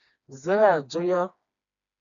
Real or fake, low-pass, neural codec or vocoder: fake; 7.2 kHz; codec, 16 kHz, 2 kbps, FreqCodec, smaller model